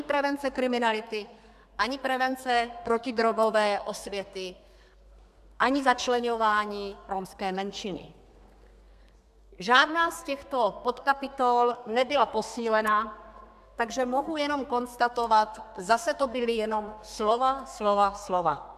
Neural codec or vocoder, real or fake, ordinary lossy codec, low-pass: codec, 32 kHz, 1.9 kbps, SNAC; fake; AAC, 96 kbps; 14.4 kHz